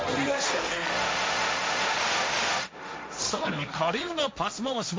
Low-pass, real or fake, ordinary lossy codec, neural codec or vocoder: none; fake; none; codec, 16 kHz, 1.1 kbps, Voila-Tokenizer